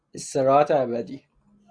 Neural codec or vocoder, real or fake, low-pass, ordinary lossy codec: none; real; 9.9 kHz; Opus, 64 kbps